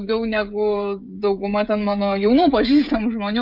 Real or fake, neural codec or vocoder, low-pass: fake; codec, 16 kHz, 16 kbps, FreqCodec, smaller model; 5.4 kHz